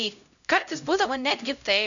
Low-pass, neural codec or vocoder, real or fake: 7.2 kHz; codec, 16 kHz, 0.5 kbps, X-Codec, HuBERT features, trained on LibriSpeech; fake